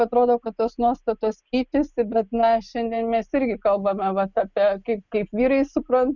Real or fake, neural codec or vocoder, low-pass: real; none; 7.2 kHz